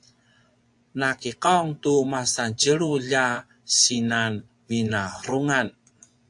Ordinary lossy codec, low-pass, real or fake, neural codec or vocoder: AAC, 64 kbps; 10.8 kHz; fake; vocoder, 24 kHz, 100 mel bands, Vocos